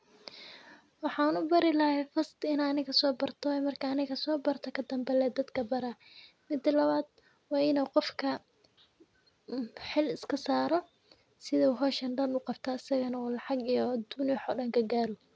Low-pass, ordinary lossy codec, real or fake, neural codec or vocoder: none; none; real; none